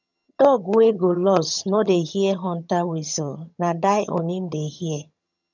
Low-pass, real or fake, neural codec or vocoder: 7.2 kHz; fake; vocoder, 22.05 kHz, 80 mel bands, HiFi-GAN